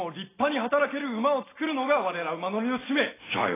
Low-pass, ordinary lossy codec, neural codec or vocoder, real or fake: 3.6 kHz; AAC, 16 kbps; none; real